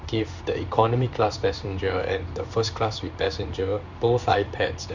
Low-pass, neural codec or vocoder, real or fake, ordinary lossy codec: 7.2 kHz; codec, 16 kHz in and 24 kHz out, 1 kbps, XY-Tokenizer; fake; none